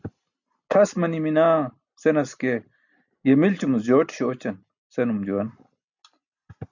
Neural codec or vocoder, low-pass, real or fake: none; 7.2 kHz; real